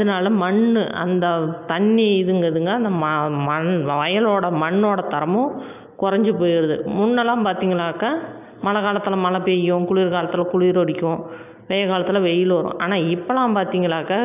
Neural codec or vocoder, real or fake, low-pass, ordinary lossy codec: none; real; 3.6 kHz; none